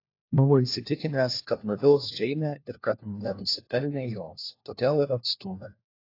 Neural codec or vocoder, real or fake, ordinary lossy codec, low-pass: codec, 16 kHz, 1 kbps, FunCodec, trained on LibriTTS, 50 frames a second; fake; AAC, 32 kbps; 5.4 kHz